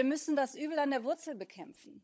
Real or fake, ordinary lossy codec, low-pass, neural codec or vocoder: fake; none; none; codec, 16 kHz, 16 kbps, FunCodec, trained on LibriTTS, 50 frames a second